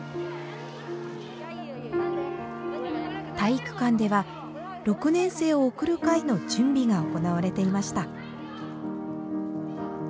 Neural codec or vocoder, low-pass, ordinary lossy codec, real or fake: none; none; none; real